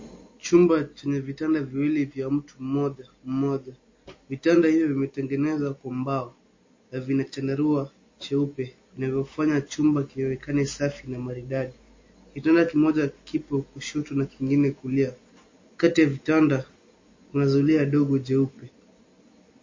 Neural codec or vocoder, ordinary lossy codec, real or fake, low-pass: none; MP3, 32 kbps; real; 7.2 kHz